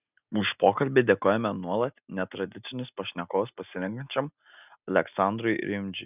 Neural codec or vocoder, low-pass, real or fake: none; 3.6 kHz; real